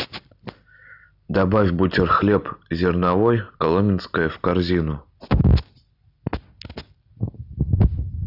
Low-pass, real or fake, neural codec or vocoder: 5.4 kHz; real; none